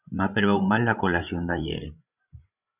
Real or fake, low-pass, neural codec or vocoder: fake; 3.6 kHz; codec, 16 kHz, 16 kbps, FreqCodec, larger model